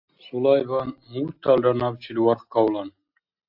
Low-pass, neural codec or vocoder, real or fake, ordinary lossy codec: 5.4 kHz; none; real; AAC, 48 kbps